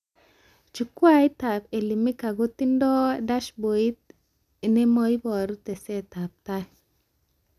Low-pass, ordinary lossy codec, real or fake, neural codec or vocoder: 14.4 kHz; none; real; none